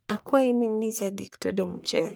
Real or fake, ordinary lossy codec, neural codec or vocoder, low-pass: fake; none; codec, 44.1 kHz, 1.7 kbps, Pupu-Codec; none